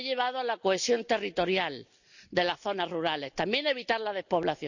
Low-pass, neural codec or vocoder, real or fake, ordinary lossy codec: 7.2 kHz; none; real; none